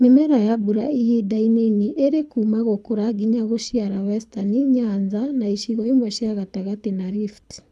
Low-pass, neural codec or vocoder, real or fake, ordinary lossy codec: none; codec, 24 kHz, 6 kbps, HILCodec; fake; none